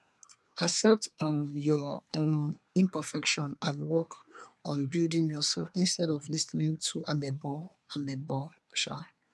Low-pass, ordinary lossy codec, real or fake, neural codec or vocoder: none; none; fake; codec, 24 kHz, 1 kbps, SNAC